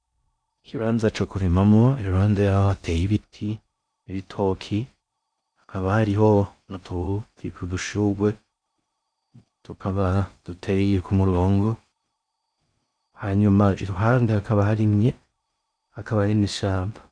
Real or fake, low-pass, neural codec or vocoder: fake; 9.9 kHz; codec, 16 kHz in and 24 kHz out, 0.6 kbps, FocalCodec, streaming, 4096 codes